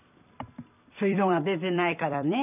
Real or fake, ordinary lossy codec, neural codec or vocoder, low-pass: fake; none; vocoder, 44.1 kHz, 128 mel bands every 256 samples, BigVGAN v2; 3.6 kHz